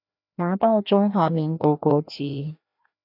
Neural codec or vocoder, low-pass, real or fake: codec, 16 kHz, 1 kbps, FreqCodec, larger model; 5.4 kHz; fake